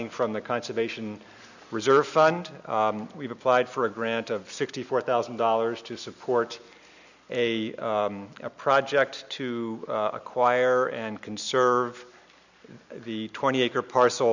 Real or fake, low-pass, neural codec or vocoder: real; 7.2 kHz; none